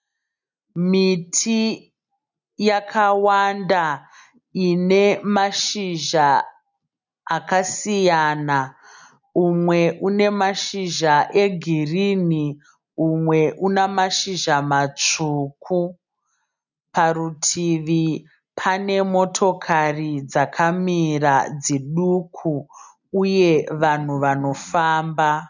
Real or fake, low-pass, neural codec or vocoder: real; 7.2 kHz; none